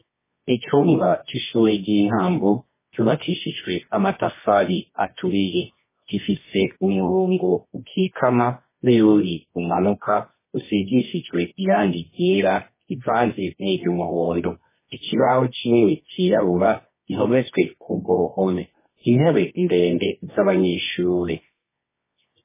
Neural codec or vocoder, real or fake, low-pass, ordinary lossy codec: codec, 24 kHz, 0.9 kbps, WavTokenizer, medium music audio release; fake; 3.6 kHz; MP3, 16 kbps